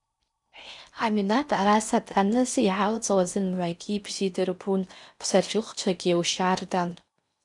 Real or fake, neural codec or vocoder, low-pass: fake; codec, 16 kHz in and 24 kHz out, 0.6 kbps, FocalCodec, streaming, 4096 codes; 10.8 kHz